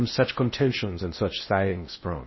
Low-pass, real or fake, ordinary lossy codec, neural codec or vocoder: 7.2 kHz; fake; MP3, 24 kbps; codec, 16 kHz in and 24 kHz out, 0.6 kbps, FocalCodec, streaming, 4096 codes